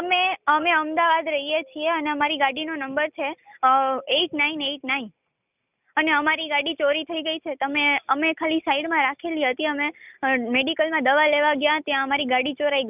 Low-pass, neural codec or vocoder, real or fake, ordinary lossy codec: 3.6 kHz; none; real; none